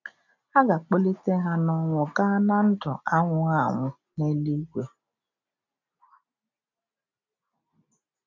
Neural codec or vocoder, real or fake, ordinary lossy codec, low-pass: none; real; none; 7.2 kHz